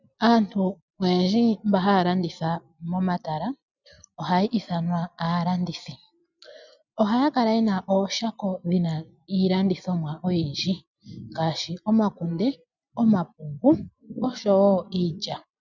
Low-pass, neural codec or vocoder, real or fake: 7.2 kHz; none; real